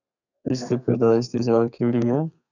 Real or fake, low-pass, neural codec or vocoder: fake; 7.2 kHz; codec, 32 kHz, 1.9 kbps, SNAC